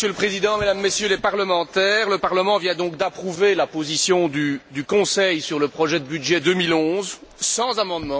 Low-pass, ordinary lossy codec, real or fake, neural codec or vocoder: none; none; real; none